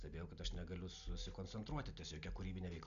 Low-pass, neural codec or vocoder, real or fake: 7.2 kHz; none; real